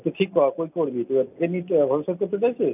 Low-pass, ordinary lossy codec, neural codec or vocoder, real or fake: 3.6 kHz; none; none; real